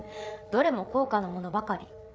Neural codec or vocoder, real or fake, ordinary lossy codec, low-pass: codec, 16 kHz, 8 kbps, FreqCodec, larger model; fake; none; none